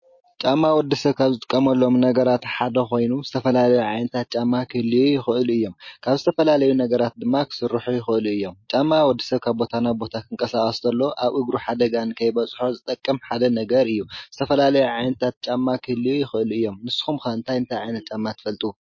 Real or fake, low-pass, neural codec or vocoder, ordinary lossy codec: real; 7.2 kHz; none; MP3, 32 kbps